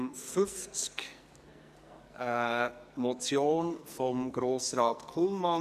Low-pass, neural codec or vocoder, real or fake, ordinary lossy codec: 14.4 kHz; codec, 44.1 kHz, 2.6 kbps, SNAC; fake; none